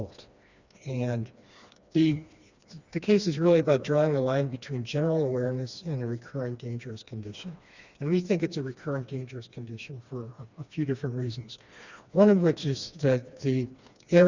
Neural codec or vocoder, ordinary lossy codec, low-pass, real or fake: codec, 16 kHz, 2 kbps, FreqCodec, smaller model; Opus, 64 kbps; 7.2 kHz; fake